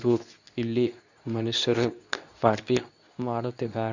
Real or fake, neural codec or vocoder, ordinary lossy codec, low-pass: fake; codec, 24 kHz, 0.9 kbps, WavTokenizer, medium speech release version 2; none; 7.2 kHz